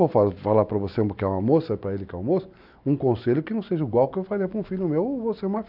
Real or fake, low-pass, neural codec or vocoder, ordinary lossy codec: real; 5.4 kHz; none; none